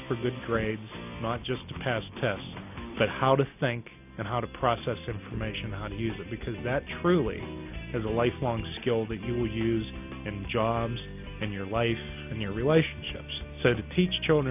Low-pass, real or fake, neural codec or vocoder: 3.6 kHz; real; none